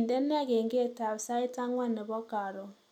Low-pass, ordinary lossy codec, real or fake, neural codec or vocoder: 19.8 kHz; none; real; none